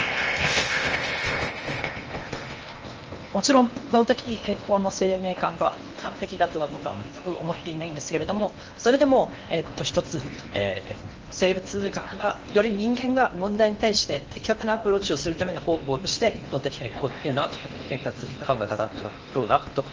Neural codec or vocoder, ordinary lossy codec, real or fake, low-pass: codec, 16 kHz in and 24 kHz out, 0.8 kbps, FocalCodec, streaming, 65536 codes; Opus, 24 kbps; fake; 7.2 kHz